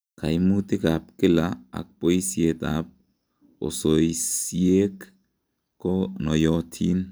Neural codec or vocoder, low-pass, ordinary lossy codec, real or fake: none; none; none; real